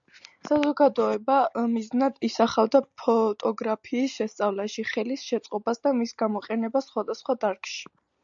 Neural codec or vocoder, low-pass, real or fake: none; 7.2 kHz; real